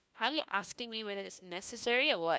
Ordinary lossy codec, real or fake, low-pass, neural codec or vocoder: none; fake; none; codec, 16 kHz, 1 kbps, FunCodec, trained on LibriTTS, 50 frames a second